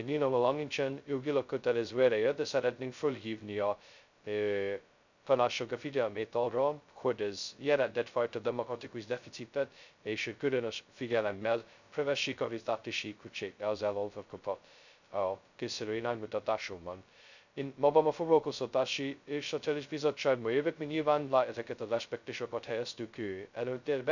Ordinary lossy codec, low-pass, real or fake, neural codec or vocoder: none; 7.2 kHz; fake; codec, 16 kHz, 0.2 kbps, FocalCodec